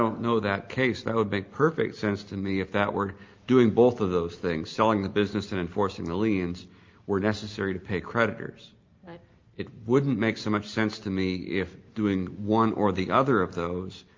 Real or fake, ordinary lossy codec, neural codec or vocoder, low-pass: real; Opus, 24 kbps; none; 7.2 kHz